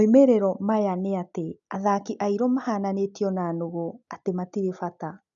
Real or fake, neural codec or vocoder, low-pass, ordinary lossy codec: real; none; 7.2 kHz; none